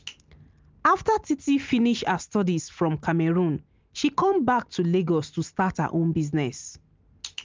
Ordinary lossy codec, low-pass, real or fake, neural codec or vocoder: Opus, 32 kbps; 7.2 kHz; real; none